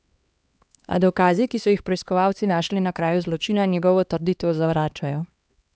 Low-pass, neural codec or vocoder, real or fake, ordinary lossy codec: none; codec, 16 kHz, 2 kbps, X-Codec, HuBERT features, trained on LibriSpeech; fake; none